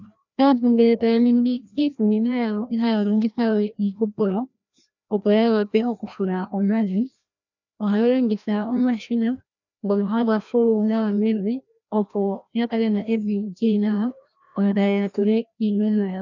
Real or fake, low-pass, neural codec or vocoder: fake; 7.2 kHz; codec, 16 kHz, 1 kbps, FreqCodec, larger model